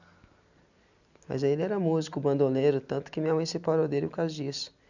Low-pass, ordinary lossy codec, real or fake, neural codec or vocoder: 7.2 kHz; none; real; none